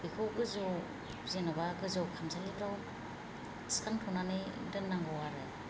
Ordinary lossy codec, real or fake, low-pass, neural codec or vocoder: none; real; none; none